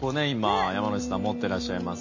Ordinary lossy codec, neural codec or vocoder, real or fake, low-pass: MP3, 32 kbps; none; real; 7.2 kHz